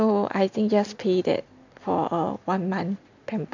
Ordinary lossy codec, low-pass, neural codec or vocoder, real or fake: none; 7.2 kHz; none; real